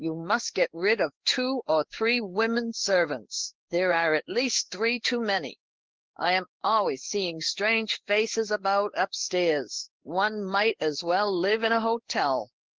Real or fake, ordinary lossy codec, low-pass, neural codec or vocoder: fake; Opus, 16 kbps; 7.2 kHz; codec, 16 kHz in and 24 kHz out, 1 kbps, XY-Tokenizer